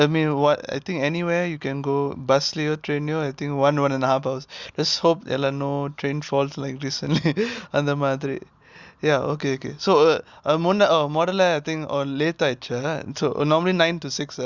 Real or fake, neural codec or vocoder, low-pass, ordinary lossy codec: real; none; 7.2 kHz; Opus, 64 kbps